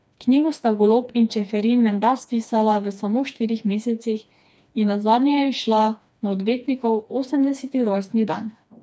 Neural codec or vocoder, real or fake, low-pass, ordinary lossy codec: codec, 16 kHz, 2 kbps, FreqCodec, smaller model; fake; none; none